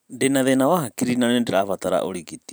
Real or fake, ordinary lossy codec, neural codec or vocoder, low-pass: fake; none; vocoder, 44.1 kHz, 128 mel bands every 512 samples, BigVGAN v2; none